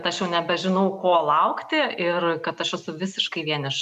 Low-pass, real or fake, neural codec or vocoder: 14.4 kHz; real; none